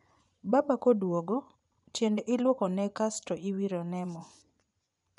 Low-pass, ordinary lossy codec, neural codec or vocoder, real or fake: 10.8 kHz; none; vocoder, 24 kHz, 100 mel bands, Vocos; fake